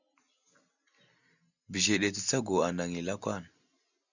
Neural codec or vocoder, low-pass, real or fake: none; 7.2 kHz; real